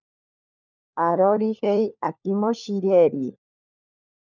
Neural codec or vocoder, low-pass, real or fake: codec, 16 kHz, 4 kbps, FunCodec, trained on LibriTTS, 50 frames a second; 7.2 kHz; fake